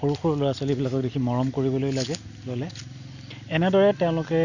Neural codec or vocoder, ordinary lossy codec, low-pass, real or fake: none; none; 7.2 kHz; real